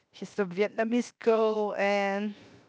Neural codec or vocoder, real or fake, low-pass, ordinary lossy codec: codec, 16 kHz, 0.7 kbps, FocalCodec; fake; none; none